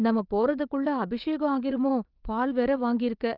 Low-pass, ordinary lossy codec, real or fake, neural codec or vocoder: 5.4 kHz; Opus, 32 kbps; fake; vocoder, 22.05 kHz, 80 mel bands, WaveNeXt